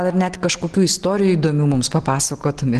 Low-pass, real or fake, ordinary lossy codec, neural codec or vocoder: 10.8 kHz; real; Opus, 16 kbps; none